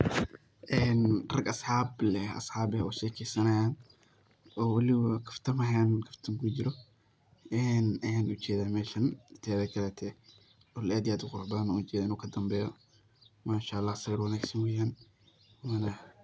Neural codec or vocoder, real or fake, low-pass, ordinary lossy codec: none; real; none; none